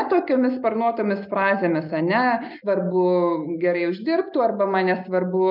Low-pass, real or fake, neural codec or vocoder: 5.4 kHz; real; none